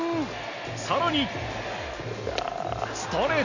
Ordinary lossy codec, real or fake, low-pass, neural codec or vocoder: none; real; 7.2 kHz; none